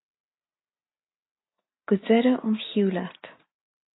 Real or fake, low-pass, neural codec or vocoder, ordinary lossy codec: real; 7.2 kHz; none; AAC, 16 kbps